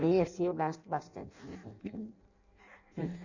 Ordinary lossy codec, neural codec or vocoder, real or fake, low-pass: none; codec, 16 kHz in and 24 kHz out, 0.6 kbps, FireRedTTS-2 codec; fake; 7.2 kHz